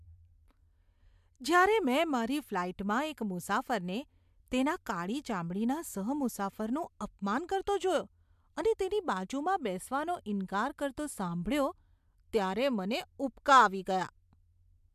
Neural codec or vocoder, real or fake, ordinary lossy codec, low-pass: none; real; MP3, 96 kbps; 14.4 kHz